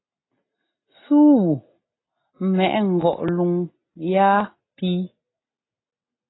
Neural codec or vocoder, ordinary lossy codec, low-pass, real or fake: none; AAC, 16 kbps; 7.2 kHz; real